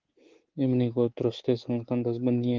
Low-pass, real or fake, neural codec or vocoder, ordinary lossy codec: 7.2 kHz; fake; codec, 24 kHz, 3.1 kbps, DualCodec; Opus, 16 kbps